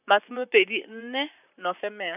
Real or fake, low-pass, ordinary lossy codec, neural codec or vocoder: fake; 3.6 kHz; none; vocoder, 44.1 kHz, 128 mel bands, Pupu-Vocoder